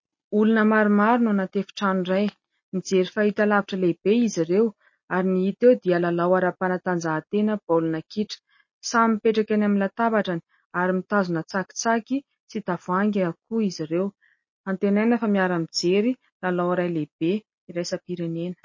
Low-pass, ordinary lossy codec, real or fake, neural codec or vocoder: 7.2 kHz; MP3, 32 kbps; real; none